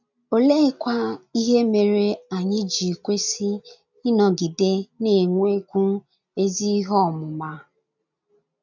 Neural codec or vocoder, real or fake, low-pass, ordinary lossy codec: none; real; 7.2 kHz; none